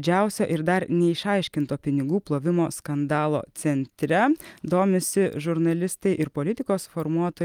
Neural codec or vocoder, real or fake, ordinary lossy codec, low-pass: none; real; Opus, 32 kbps; 19.8 kHz